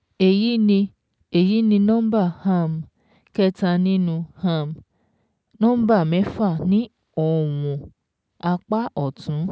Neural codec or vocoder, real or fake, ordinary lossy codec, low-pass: none; real; none; none